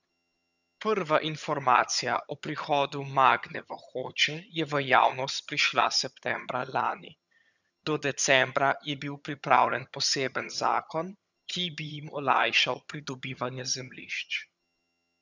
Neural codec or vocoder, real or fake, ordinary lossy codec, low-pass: vocoder, 22.05 kHz, 80 mel bands, HiFi-GAN; fake; none; 7.2 kHz